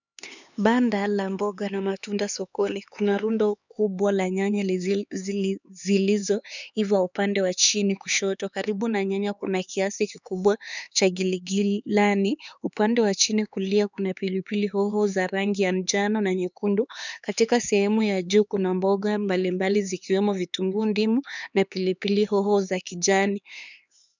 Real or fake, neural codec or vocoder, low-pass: fake; codec, 16 kHz, 4 kbps, X-Codec, HuBERT features, trained on LibriSpeech; 7.2 kHz